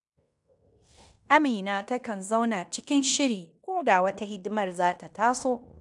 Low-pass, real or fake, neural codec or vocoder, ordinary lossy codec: 10.8 kHz; fake; codec, 16 kHz in and 24 kHz out, 0.9 kbps, LongCat-Audio-Codec, fine tuned four codebook decoder; none